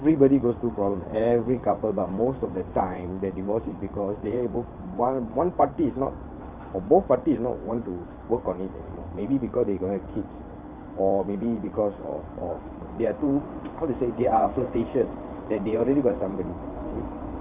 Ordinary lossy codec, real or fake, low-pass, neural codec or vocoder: none; fake; 3.6 kHz; vocoder, 22.05 kHz, 80 mel bands, WaveNeXt